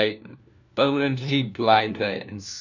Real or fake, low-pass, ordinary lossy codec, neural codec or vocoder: fake; 7.2 kHz; none; codec, 16 kHz, 1 kbps, FunCodec, trained on LibriTTS, 50 frames a second